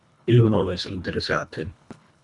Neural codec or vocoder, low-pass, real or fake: codec, 24 kHz, 1.5 kbps, HILCodec; 10.8 kHz; fake